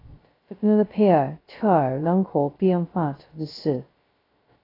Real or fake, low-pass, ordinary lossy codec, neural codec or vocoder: fake; 5.4 kHz; AAC, 24 kbps; codec, 16 kHz, 0.2 kbps, FocalCodec